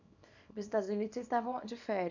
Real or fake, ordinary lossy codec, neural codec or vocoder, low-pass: fake; none; codec, 24 kHz, 0.9 kbps, WavTokenizer, small release; 7.2 kHz